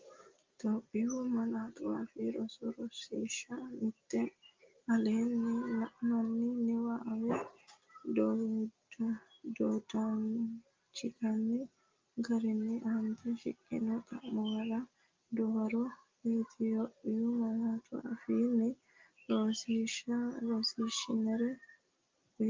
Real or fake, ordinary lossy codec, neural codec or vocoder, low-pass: real; Opus, 32 kbps; none; 7.2 kHz